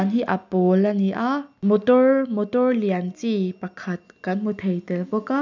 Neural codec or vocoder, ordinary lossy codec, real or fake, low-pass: none; none; real; 7.2 kHz